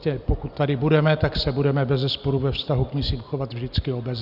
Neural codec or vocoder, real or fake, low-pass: none; real; 5.4 kHz